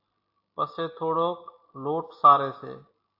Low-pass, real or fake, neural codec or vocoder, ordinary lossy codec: 5.4 kHz; real; none; AAC, 32 kbps